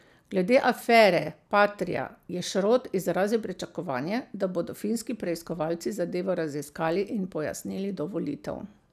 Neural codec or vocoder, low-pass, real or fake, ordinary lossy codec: none; 14.4 kHz; real; none